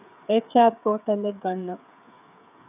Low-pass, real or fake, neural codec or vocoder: 3.6 kHz; fake; codec, 16 kHz, 8 kbps, FreqCodec, smaller model